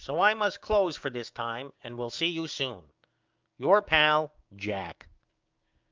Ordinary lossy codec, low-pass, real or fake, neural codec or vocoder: Opus, 24 kbps; 7.2 kHz; fake; codec, 16 kHz, 6 kbps, DAC